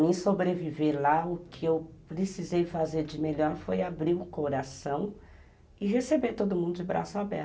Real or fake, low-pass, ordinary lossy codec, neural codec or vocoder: real; none; none; none